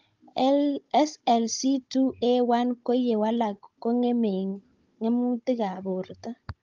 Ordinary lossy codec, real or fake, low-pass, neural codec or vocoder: Opus, 32 kbps; fake; 7.2 kHz; codec, 16 kHz, 16 kbps, FunCodec, trained on Chinese and English, 50 frames a second